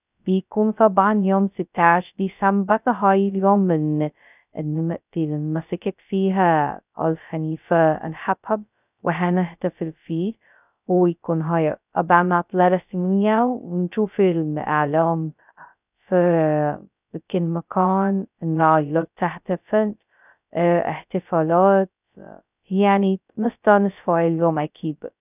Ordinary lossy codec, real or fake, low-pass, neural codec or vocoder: none; fake; 3.6 kHz; codec, 16 kHz, 0.2 kbps, FocalCodec